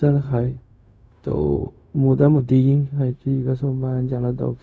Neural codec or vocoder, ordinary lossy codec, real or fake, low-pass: codec, 16 kHz, 0.4 kbps, LongCat-Audio-Codec; none; fake; none